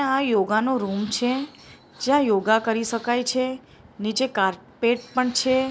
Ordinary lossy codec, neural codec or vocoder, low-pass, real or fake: none; none; none; real